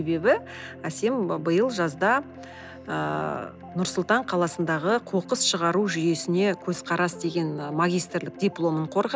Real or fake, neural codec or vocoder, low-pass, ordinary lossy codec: real; none; none; none